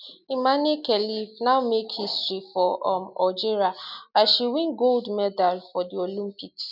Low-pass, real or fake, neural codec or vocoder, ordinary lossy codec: 5.4 kHz; real; none; none